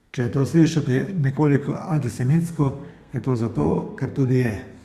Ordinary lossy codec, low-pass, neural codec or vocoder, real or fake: Opus, 64 kbps; 14.4 kHz; codec, 32 kHz, 1.9 kbps, SNAC; fake